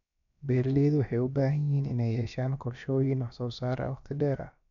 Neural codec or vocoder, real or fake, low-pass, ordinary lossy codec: codec, 16 kHz, about 1 kbps, DyCAST, with the encoder's durations; fake; 7.2 kHz; none